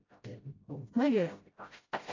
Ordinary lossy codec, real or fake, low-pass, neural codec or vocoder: AAC, 32 kbps; fake; 7.2 kHz; codec, 16 kHz, 0.5 kbps, FreqCodec, smaller model